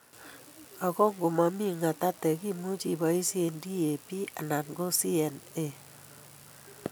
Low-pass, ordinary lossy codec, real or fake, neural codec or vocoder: none; none; real; none